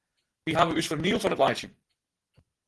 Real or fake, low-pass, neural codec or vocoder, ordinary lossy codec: real; 10.8 kHz; none; Opus, 16 kbps